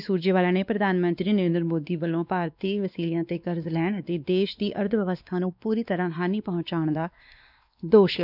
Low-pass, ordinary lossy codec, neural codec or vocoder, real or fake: 5.4 kHz; none; codec, 16 kHz, 2 kbps, X-Codec, WavLM features, trained on Multilingual LibriSpeech; fake